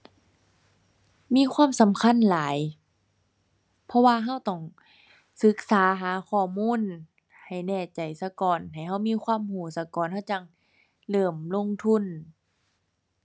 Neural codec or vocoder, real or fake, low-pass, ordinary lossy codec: none; real; none; none